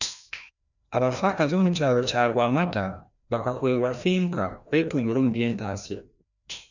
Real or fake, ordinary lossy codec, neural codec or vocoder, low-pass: fake; none; codec, 16 kHz, 1 kbps, FreqCodec, larger model; 7.2 kHz